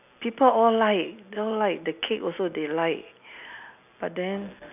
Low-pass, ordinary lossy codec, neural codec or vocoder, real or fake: 3.6 kHz; none; none; real